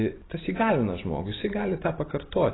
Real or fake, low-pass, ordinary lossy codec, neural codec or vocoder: real; 7.2 kHz; AAC, 16 kbps; none